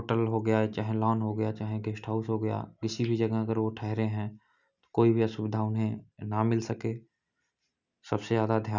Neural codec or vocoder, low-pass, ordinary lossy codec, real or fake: none; 7.2 kHz; none; real